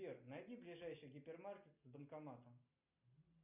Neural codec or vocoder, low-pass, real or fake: none; 3.6 kHz; real